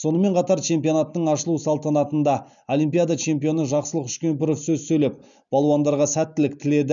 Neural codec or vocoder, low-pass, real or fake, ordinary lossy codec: none; 7.2 kHz; real; none